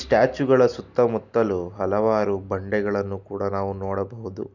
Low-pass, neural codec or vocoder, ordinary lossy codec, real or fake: 7.2 kHz; none; none; real